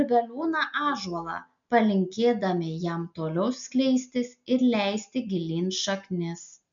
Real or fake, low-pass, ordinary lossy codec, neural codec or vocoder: real; 7.2 kHz; MP3, 64 kbps; none